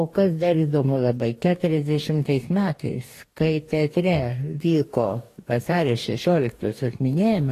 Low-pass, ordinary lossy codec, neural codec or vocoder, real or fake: 14.4 kHz; AAC, 48 kbps; codec, 44.1 kHz, 2.6 kbps, DAC; fake